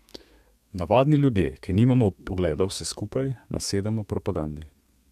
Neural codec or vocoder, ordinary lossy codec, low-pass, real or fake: codec, 32 kHz, 1.9 kbps, SNAC; none; 14.4 kHz; fake